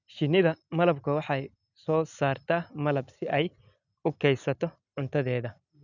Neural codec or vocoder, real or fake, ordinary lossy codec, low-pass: none; real; none; 7.2 kHz